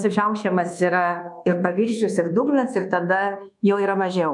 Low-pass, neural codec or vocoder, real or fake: 10.8 kHz; codec, 24 kHz, 1.2 kbps, DualCodec; fake